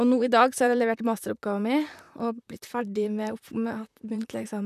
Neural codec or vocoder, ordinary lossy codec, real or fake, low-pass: vocoder, 44.1 kHz, 128 mel bands, Pupu-Vocoder; none; fake; 14.4 kHz